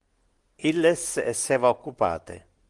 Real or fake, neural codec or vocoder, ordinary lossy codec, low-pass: real; none; Opus, 24 kbps; 10.8 kHz